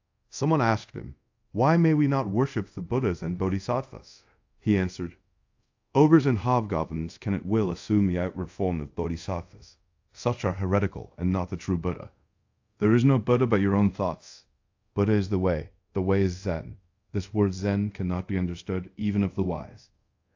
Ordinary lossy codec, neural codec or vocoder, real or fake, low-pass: AAC, 48 kbps; codec, 24 kHz, 0.5 kbps, DualCodec; fake; 7.2 kHz